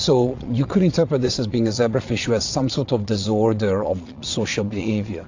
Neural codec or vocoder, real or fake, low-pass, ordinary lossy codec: vocoder, 22.05 kHz, 80 mel bands, Vocos; fake; 7.2 kHz; AAC, 48 kbps